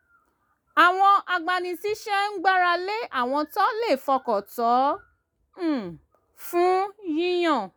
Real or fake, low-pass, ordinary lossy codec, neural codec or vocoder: real; none; none; none